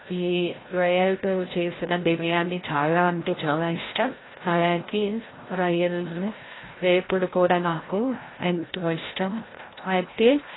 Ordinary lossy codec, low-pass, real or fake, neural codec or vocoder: AAC, 16 kbps; 7.2 kHz; fake; codec, 16 kHz, 0.5 kbps, FreqCodec, larger model